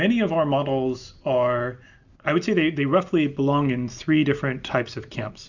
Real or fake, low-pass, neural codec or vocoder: real; 7.2 kHz; none